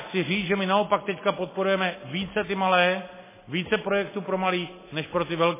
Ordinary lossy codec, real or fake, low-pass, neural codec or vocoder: MP3, 16 kbps; real; 3.6 kHz; none